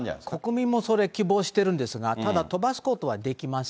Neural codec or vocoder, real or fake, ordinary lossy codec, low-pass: none; real; none; none